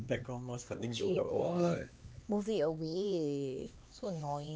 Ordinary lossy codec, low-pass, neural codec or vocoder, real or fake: none; none; codec, 16 kHz, 2 kbps, X-Codec, HuBERT features, trained on LibriSpeech; fake